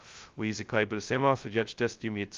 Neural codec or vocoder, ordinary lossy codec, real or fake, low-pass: codec, 16 kHz, 0.2 kbps, FocalCodec; Opus, 32 kbps; fake; 7.2 kHz